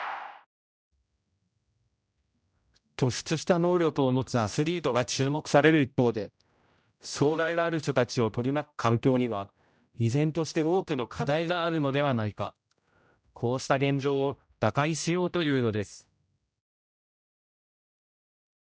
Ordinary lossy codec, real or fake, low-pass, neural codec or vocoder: none; fake; none; codec, 16 kHz, 0.5 kbps, X-Codec, HuBERT features, trained on general audio